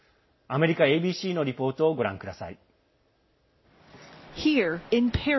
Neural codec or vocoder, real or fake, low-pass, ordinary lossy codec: none; real; 7.2 kHz; MP3, 24 kbps